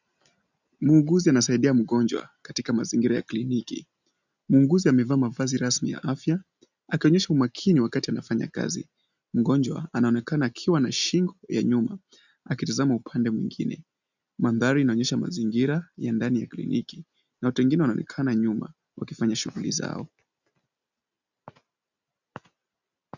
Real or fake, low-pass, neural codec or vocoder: real; 7.2 kHz; none